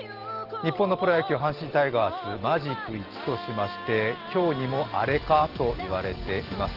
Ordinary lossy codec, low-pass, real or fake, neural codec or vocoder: Opus, 16 kbps; 5.4 kHz; real; none